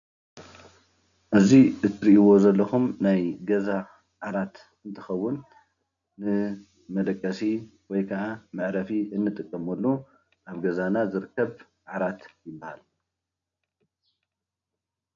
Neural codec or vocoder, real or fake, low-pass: none; real; 7.2 kHz